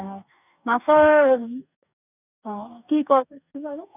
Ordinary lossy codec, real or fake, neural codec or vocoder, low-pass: none; fake; codec, 44.1 kHz, 2.6 kbps, DAC; 3.6 kHz